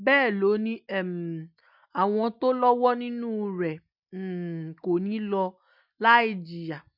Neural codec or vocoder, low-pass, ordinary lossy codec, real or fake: none; 5.4 kHz; none; real